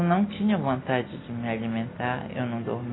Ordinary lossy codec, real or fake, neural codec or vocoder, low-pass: AAC, 16 kbps; real; none; 7.2 kHz